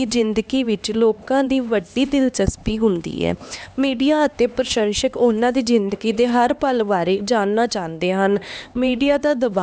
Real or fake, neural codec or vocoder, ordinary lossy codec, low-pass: fake; codec, 16 kHz, 2 kbps, X-Codec, HuBERT features, trained on LibriSpeech; none; none